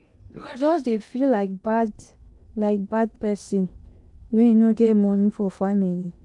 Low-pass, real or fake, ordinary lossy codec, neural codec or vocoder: 10.8 kHz; fake; none; codec, 16 kHz in and 24 kHz out, 0.8 kbps, FocalCodec, streaming, 65536 codes